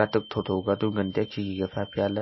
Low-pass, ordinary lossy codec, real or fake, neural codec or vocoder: 7.2 kHz; MP3, 24 kbps; real; none